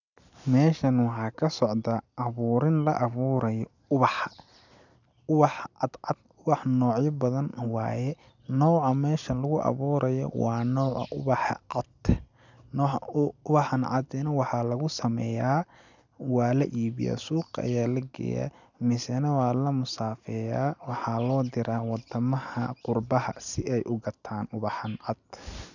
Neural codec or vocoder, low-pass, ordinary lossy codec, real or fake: none; 7.2 kHz; none; real